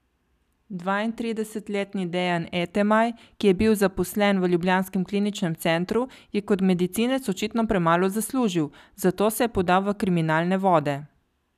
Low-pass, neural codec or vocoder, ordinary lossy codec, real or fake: 14.4 kHz; none; none; real